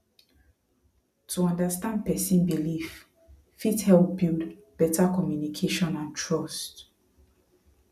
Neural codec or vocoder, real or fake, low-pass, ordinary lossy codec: none; real; 14.4 kHz; none